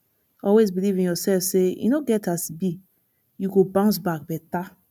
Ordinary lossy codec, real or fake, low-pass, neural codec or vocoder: none; real; 19.8 kHz; none